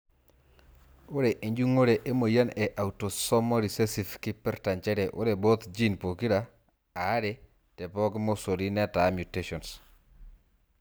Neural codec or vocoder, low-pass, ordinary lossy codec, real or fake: none; none; none; real